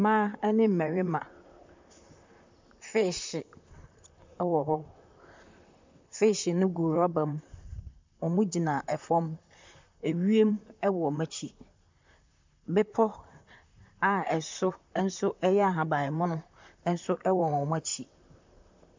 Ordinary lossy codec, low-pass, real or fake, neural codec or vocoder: MP3, 64 kbps; 7.2 kHz; fake; vocoder, 44.1 kHz, 128 mel bands, Pupu-Vocoder